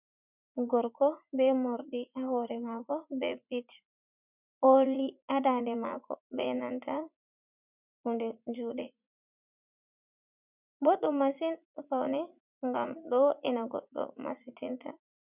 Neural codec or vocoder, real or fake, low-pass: vocoder, 44.1 kHz, 80 mel bands, Vocos; fake; 3.6 kHz